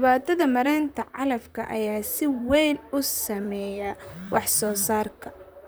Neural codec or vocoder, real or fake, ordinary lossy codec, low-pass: vocoder, 44.1 kHz, 128 mel bands, Pupu-Vocoder; fake; none; none